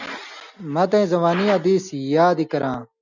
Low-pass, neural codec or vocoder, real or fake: 7.2 kHz; none; real